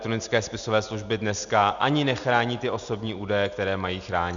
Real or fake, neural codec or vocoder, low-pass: real; none; 7.2 kHz